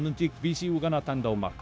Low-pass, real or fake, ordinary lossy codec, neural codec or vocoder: none; fake; none; codec, 16 kHz, 0.9 kbps, LongCat-Audio-Codec